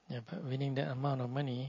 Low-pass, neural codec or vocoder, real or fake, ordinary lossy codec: 7.2 kHz; none; real; MP3, 32 kbps